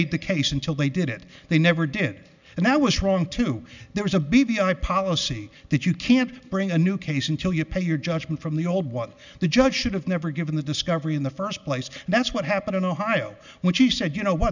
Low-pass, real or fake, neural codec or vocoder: 7.2 kHz; real; none